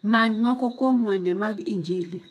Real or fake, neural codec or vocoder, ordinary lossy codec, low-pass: fake; codec, 32 kHz, 1.9 kbps, SNAC; none; 14.4 kHz